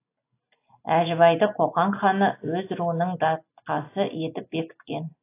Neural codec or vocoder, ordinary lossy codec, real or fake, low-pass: none; AAC, 24 kbps; real; 3.6 kHz